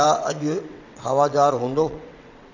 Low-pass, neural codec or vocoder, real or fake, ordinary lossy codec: 7.2 kHz; vocoder, 22.05 kHz, 80 mel bands, WaveNeXt; fake; none